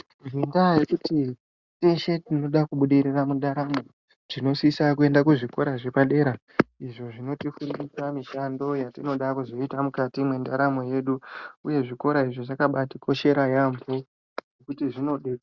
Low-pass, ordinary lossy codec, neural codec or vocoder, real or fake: 7.2 kHz; Opus, 64 kbps; none; real